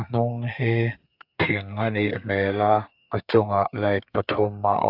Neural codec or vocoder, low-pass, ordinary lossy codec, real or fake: codec, 32 kHz, 1.9 kbps, SNAC; 5.4 kHz; AAC, 48 kbps; fake